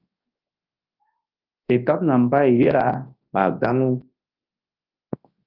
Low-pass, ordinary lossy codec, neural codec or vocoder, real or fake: 5.4 kHz; Opus, 24 kbps; codec, 24 kHz, 0.9 kbps, WavTokenizer, large speech release; fake